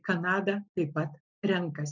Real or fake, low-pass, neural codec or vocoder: real; 7.2 kHz; none